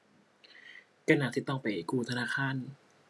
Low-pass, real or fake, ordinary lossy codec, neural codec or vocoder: none; real; none; none